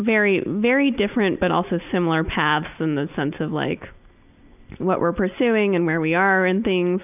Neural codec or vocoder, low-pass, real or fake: none; 3.6 kHz; real